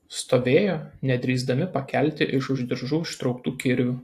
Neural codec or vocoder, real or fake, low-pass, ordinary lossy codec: none; real; 14.4 kHz; AAC, 64 kbps